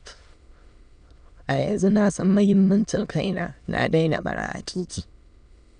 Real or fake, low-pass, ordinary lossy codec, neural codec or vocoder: fake; 9.9 kHz; none; autoencoder, 22.05 kHz, a latent of 192 numbers a frame, VITS, trained on many speakers